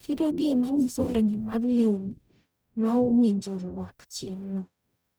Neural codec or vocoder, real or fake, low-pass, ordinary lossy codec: codec, 44.1 kHz, 0.9 kbps, DAC; fake; none; none